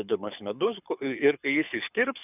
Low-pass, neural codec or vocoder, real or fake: 3.6 kHz; codec, 24 kHz, 6 kbps, HILCodec; fake